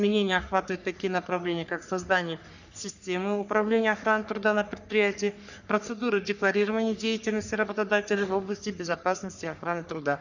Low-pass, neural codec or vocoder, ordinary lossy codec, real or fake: 7.2 kHz; codec, 44.1 kHz, 3.4 kbps, Pupu-Codec; none; fake